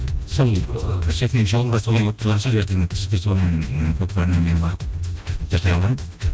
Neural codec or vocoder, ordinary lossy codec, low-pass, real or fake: codec, 16 kHz, 1 kbps, FreqCodec, smaller model; none; none; fake